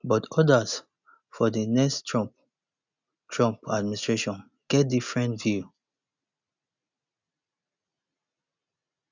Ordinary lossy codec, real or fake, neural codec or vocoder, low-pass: none; real; none; 7.2 kHz